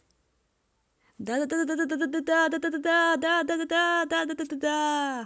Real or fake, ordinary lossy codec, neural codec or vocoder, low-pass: real; none; none; none